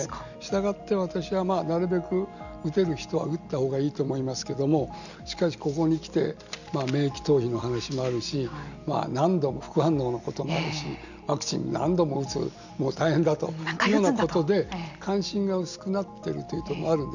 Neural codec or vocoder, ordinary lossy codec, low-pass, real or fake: none; none; 7.2 kHz; real